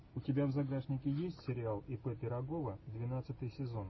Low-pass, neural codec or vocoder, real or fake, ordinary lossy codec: 7.2 kHz; none; real; MP3, 24 kbps